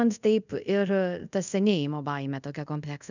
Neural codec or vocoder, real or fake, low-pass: codec, 24 kHz, 0.5 kbps, DualCodec; fake; 7.2 kHz